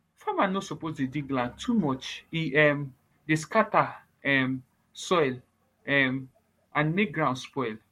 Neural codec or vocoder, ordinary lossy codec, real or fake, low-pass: codec, 44.1 kHz, 7.8 kbps, Pupu-Codec; MP3, 64 kbps; fake; 19.8 kHz